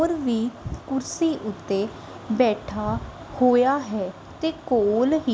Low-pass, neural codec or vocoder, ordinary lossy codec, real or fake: none; none; none; real